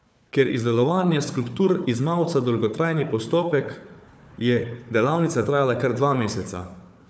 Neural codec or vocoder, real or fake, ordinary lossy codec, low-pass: codec, 16 kHz, 4 kbps, FunCodec, trained on Chinese and English, 50 frames a second; fake; none; none